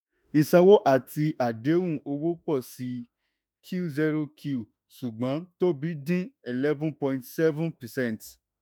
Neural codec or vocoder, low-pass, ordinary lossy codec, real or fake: autoencoder, 48 kHz, 32 numbers a frame, DAC-VAE, trained on Japanese speech; none; none; fake